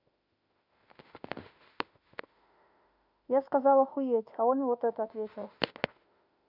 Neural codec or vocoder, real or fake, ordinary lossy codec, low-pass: autoencoder, 48 kHz, 32 numbers a frame, DAC-VAE, trained on Japanese speech; fake; none; 5.4 kHz